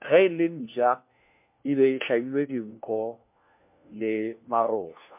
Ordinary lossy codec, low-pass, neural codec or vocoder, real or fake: MP3, 32 kbps; 3.6 kHz; codec, 16 kHz, 1 kbps, FunCodec, trained on LibriTTS, 50 frames a second; fake